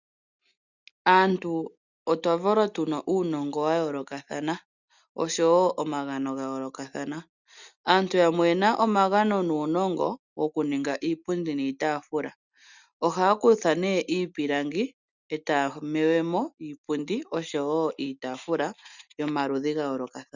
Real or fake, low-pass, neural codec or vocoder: real; 7.2 kHz; none